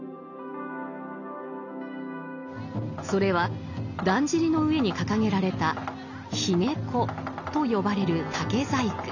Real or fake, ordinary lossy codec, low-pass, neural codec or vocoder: real; none; 7.2 kHz; none